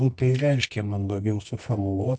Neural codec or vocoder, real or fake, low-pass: codec, 24 kHz, 0.9 kbps, WavTokenizer, medium music audio release; fake; 9.9 kHz